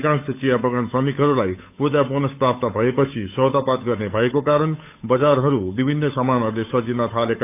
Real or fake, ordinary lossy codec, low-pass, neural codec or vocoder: fake; AAC, 32 kbps; 3.6 kHz; codec, 16 kHz, 8 kbps, FunCodec, trained on Chinese and English, 25 frames a second